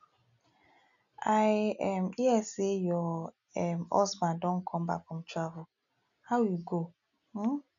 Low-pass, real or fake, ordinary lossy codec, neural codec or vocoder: 7.2 kHz; real; none; none